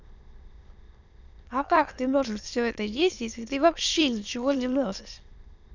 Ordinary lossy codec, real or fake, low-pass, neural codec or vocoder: none; fake; 7.2 kHz; autoencoder, 22.05 kHz, a latent of 192 numbers a frame, VITS, trained on many speakers